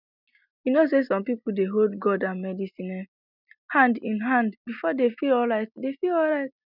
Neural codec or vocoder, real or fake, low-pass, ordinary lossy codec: none; real; 5.4 kHz; none